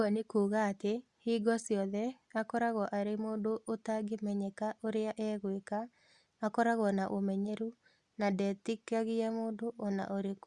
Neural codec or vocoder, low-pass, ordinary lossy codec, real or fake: none; 10.8 kHz; Opus, 64 kbps; real